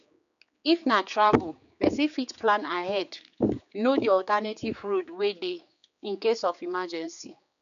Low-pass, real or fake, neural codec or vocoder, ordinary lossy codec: 7.2 kHz; fake; codec, 16 kHz, 4 kbps, X-Codec, HuBERT features, trained on general audio; none